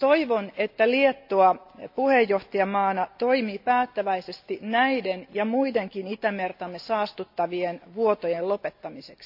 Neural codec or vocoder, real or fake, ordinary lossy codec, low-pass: none; real; AAC, 48 kbps; 5.4 kHz